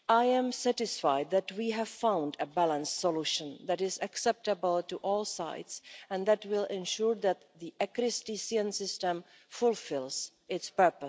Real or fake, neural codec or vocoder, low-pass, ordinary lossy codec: real; none; none; none